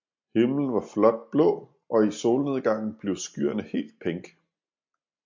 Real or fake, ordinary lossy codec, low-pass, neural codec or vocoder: real; MP3, 48 kbps; 7.2 kHz; none